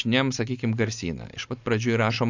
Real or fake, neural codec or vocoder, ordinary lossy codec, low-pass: real; none; AAC, 48 kbps; 7.2 kHz